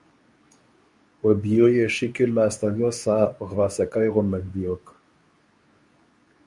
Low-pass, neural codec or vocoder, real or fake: 10.8 kHz; codec, 24 kHz, 0.9 kbps, WavTokenizer, medium speech release version 2; fake